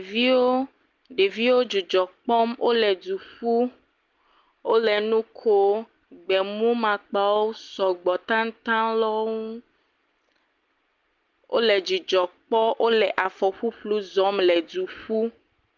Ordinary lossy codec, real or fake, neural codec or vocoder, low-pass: Opus, 24 kbps; real; none; 7.2 kHz